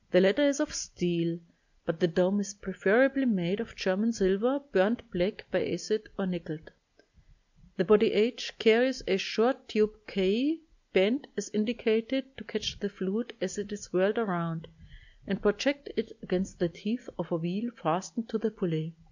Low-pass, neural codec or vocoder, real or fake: 7.2 kHz; none; real